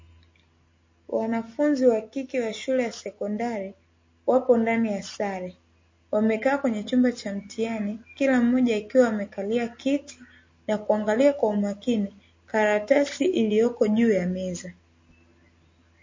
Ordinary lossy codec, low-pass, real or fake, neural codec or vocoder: MP3, 32 kbps; 7.2 kHz; real; none